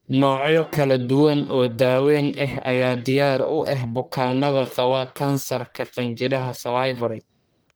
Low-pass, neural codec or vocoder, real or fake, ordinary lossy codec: none; codec, 44.1 kHz, 1.7 kbps, Pupu-Codec; fake; none